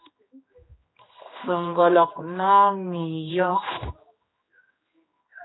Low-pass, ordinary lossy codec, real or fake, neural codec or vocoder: 7.2 kHz; AAC, 16 kbps; fake; codec, 16 kHz, 2 kbps, X-Codec, HuBERT features, trained on general audio